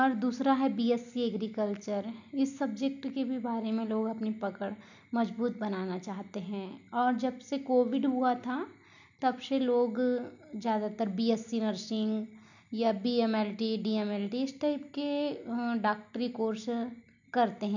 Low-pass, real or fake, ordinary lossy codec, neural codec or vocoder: 7.2 kHz; real; none; none